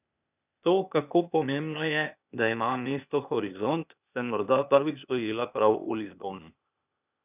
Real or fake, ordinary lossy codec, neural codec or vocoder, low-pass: fake; none; codec, 16 kHz, 0.8 kbps, ZipCodec; 3.6 kHz